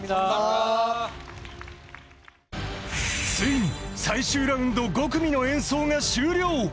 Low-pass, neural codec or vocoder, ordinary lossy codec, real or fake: none; none; none; real